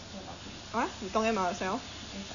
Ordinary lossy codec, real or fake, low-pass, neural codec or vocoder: none; real; 7.2 kHz; none